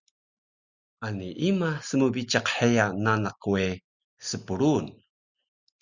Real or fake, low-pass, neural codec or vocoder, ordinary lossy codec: real; 7.2 kHz; none; Opus, 64 kbps